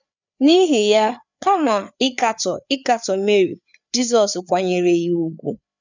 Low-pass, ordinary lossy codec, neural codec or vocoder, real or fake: 7.2 kHz; none; codec, 16 kHz, 4 kbps, FreqCodec, larger model; fake